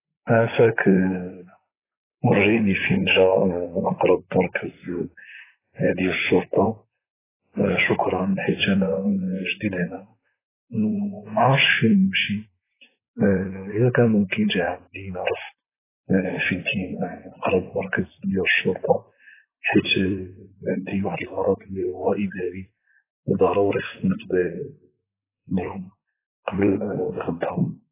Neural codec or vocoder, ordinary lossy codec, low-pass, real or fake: vocoder, 44.1 kHz, 128 mel bands, Pupu-Vocoder; AAC, 16 kbps; 3.6 kHz; fake